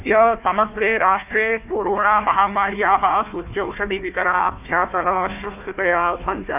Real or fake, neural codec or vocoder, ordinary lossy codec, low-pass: fake; codec, 16 kHz, 1 kbps, FunCodec, trained on Chinese and English, 50 frames a second; none; 3.6 kHz